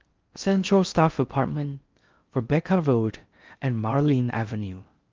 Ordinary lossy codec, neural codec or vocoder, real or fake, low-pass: Opus, 32 kbps; codec, 16 kHz in and 24 kHz out, 0.6 kbps, FocalCodec, streaming, 4096 codes; fake; 7.2 kHz